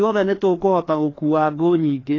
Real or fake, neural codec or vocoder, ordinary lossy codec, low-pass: fake; codec, 16 kHz, 2 kbps, FreqCodec, larger model; AAC, 32 kbps; 7.2 kHz